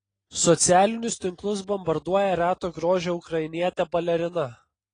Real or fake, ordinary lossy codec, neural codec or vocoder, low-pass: real; AAC, 32 kbps; none; 9.9 kHz